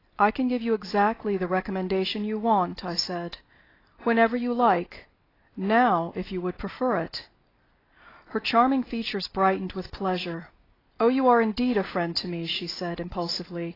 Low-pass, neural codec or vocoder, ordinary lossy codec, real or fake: 5.4 kHz; none; AAC, 24 kbps; real